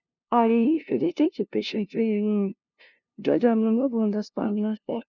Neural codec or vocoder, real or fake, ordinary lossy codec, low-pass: codec, 16 kHz, 0.5 kbps, FunCodec, trained on LibriTTS, 25 frames a second; fake; none; 7.2 kHz